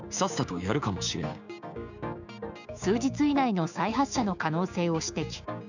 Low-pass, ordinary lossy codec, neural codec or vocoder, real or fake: 7.2 kHz; none; codec, 16 kHz, 6 kbps, DAC; fake